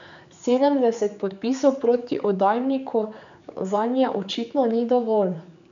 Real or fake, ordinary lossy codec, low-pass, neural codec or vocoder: fake; MP3, 96 kbps; 7.2 kHz; codec, 16 kHz, 4 kbps, X-Codec, HuBERT features, trained on general audio